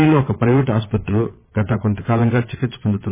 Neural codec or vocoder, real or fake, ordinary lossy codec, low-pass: vocoder, 22.05 kHz, 80 mel bands, Vocos; fake; MP3, 16 kbps; 3.6 kHz